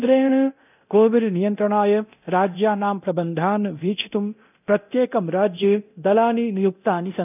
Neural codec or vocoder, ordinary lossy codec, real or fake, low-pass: codec, 24 kHz, 0.9 kbps, DualCodec; none; fake; 3.6 kHz